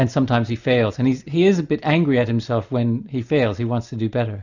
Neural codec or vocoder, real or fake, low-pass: none; real; 7.2 kHz